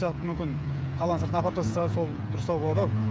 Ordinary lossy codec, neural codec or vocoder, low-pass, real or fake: none; codec, 16 kHz, 8 kbps, FreqCodec, smaller model; none; fake